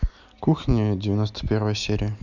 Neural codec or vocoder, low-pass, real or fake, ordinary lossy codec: none; 7.2 kHz; real; none